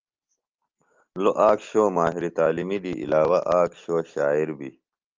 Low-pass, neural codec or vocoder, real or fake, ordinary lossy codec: 7.2 kHz; none; real; Opus, 32 kbps